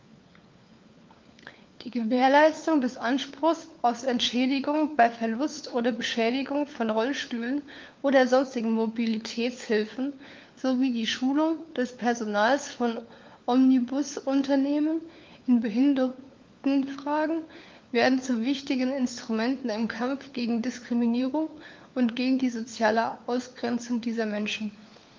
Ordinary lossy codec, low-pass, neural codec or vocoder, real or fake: Opus, 24 kbps; 7.2 kHz; codec, 16 kHz, 4 kbps, FunCodec, trained on LibriTTS, 50 frames a second; fake